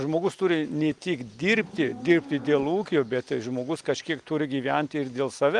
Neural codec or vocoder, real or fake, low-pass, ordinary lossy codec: none; real; 10.8 kHz; Opus, 32 kbps